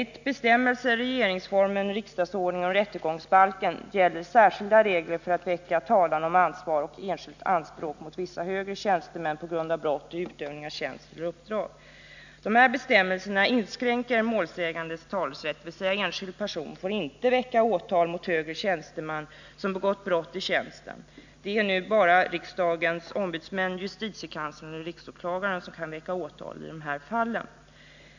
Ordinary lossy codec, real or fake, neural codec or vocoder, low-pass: none; real; none; 7.2 kHz